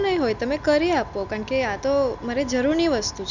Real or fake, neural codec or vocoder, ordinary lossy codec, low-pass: real; none; none; 7.2 kHz